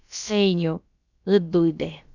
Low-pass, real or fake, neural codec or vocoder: 7.2 kHz; fake; codec, 16 kHz, about 1 kbps, DyCAST, with the encoder's durations